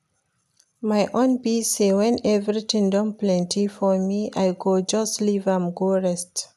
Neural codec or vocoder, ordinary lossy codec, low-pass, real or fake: none; none; 14.4 kHz; real